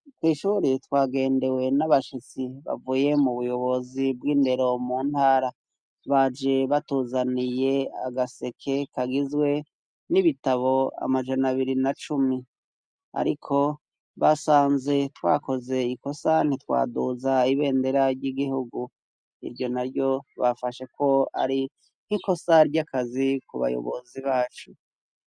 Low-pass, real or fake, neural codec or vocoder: 9.9 kHz; real; none